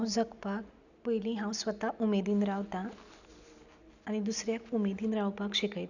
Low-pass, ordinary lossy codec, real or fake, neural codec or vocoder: 7.2 kHz; none; real; none